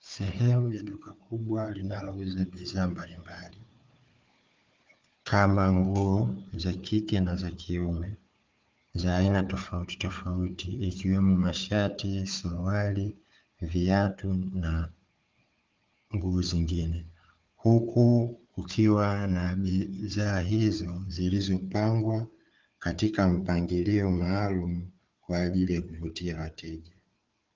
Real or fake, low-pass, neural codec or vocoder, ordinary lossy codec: fake; 7.2 kHz; codec, 16 kHz, 4 kbps, FunCodec, trained on Chinese and English, 50 frames a second; Opus, 32 kbps